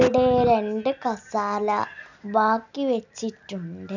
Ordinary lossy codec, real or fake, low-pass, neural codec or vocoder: none; real; 7.2 kHz; none